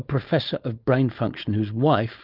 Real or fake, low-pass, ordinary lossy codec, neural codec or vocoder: fake; 5.4 kHz; Opus, 24 kbps; codec, 16 kHz, 4.8 kbps, FACodec